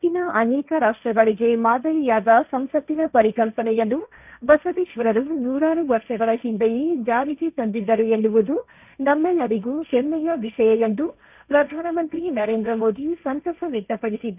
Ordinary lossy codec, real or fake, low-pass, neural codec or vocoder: none; fake; 3.6 kHz; codec, 16 kHz, 1.1 kbps, Voila-Tokenizer